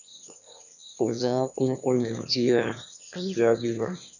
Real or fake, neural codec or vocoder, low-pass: fake; autoencoder, 22.05 kHz, a latent of 192 numbers a frame, VITS, trained on one speaker; 7.2 kHz